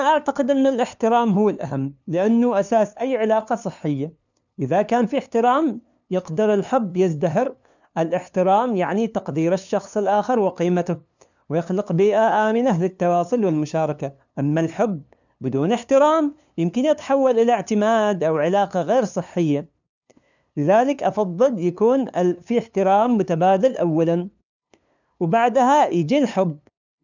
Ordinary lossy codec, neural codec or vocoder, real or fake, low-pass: none; codec, 16 kHz, 2 kbps, FunCodec, trained on LibriTTS, 25 frames a second; fake; 7.2 kHz